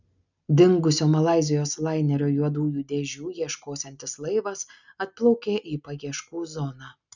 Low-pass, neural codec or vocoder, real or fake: 7.2 kHz; none; real